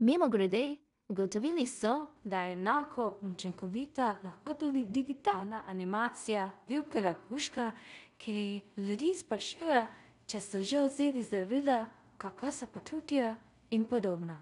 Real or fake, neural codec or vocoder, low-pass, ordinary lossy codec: fake; codec, 16 kHz in and 24 kHz out, 0.4 kbps, LongCat-Audio-Codec, two codebook decoder; 10.8 kHz; none